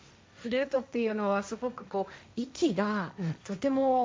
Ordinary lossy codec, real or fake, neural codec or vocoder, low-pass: none; fake; codec, 16 kHz, 1.1 kbps, Voila-Tokenizer; none